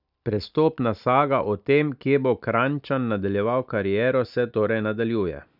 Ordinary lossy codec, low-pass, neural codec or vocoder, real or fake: none; 5.4 kHz; none; real